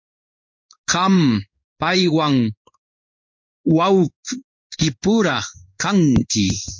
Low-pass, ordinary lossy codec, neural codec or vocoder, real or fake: 7.2 kHz; MP3, 48 kbps; codec, 16 kHz in and 24 kHz out, 1 kbps, XY-Tokenizer; fake